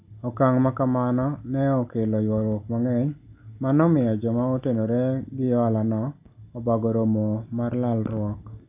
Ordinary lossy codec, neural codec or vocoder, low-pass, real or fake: AAC, 32 kbps; none; 3.6 kHz; real